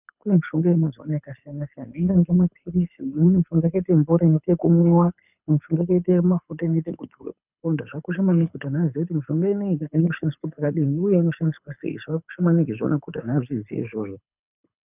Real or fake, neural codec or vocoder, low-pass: fake; codec, 24 kHz, 6 kbps, HILCodec; 3.6 kHz